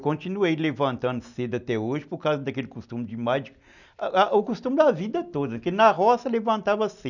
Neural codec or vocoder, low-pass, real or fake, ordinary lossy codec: none; 7.2 kHz; real; none